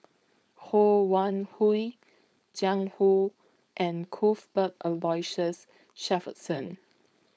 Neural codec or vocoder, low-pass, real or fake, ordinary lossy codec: codec, 16 kHz, 4.8 kbps, FACodec; none; fake; none